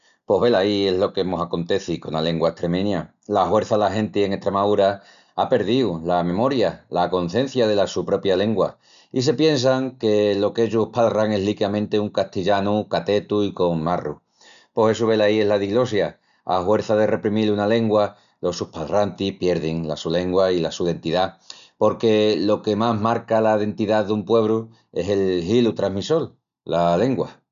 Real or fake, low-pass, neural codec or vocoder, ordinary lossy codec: real; 7.2 kHz; none; none